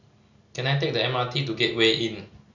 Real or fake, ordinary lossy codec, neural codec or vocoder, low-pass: real; none; none; 7.2 kHz